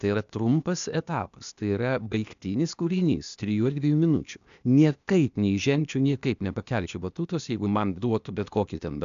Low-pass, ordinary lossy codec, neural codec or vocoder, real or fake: 7.2 kHz; AAC, 96 kbps; codec, 16 kHz, 0.8 kbps, ZipCodec; fake